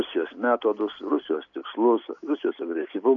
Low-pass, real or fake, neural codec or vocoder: 7.2 kHz; real; none